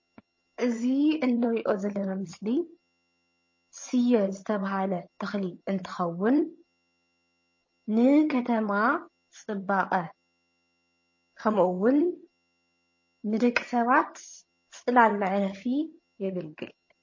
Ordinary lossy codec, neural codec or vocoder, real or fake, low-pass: MP3, 32 kbps; vocoder, 22.05 kHz, 80 mel bands, HiFi-GAN; fake; 7.2 kHz